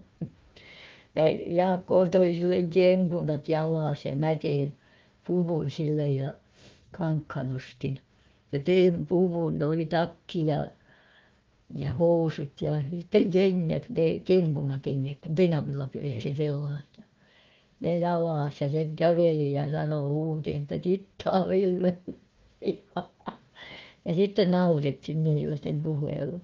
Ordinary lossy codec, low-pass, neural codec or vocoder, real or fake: Opus, 24 kbps; 7.2 kHz; codec, 16 kHz, 1 kbps, FunCodec, trained on Chinese and English, 50 frames a second; fake